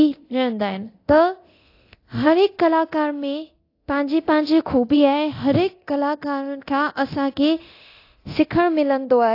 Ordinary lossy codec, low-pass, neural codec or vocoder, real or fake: AAC, 32 kbps; 5.4 kHz; codec, 24 kHz, 0.9 kbps, DualCodec; fake